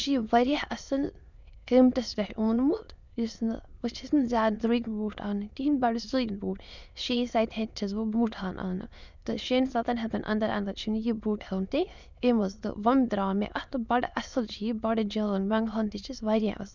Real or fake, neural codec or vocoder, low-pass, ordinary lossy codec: fake; autoencoder, 22.05 kHz, a latent of 192 numbers a frame, VITS, trained on many speakers; 7.2 kHz; none